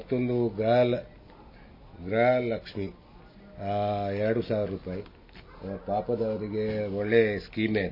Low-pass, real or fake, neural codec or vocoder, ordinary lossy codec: 5.4 kHz; real; none; MP3, 24 kbps